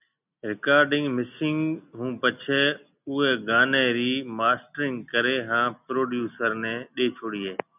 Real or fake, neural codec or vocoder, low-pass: real; none; 3.6 kHz